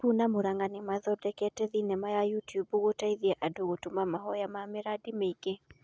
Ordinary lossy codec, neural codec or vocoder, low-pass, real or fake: none; none; none; real